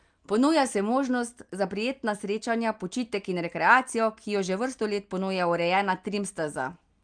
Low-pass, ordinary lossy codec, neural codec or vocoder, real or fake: 9.9 kHz; Opus, 32 kbps; none; real